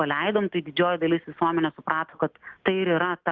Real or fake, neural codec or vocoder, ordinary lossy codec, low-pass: real; none; Opus, 24 kbps; 7.2 kHz